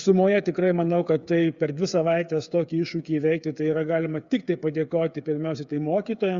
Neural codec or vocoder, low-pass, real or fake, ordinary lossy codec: codec, 16 kHz, 8 kbps, FreqCodec, smaller model; 7.2 kHz; fake; Opus, 64 kbps